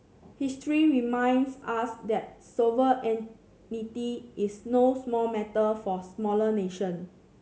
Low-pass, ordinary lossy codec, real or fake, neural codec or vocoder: none; none; real; none